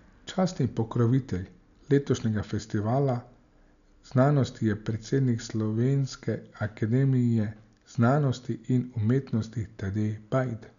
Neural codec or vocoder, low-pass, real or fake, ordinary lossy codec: none; 7.2 kHz; real; none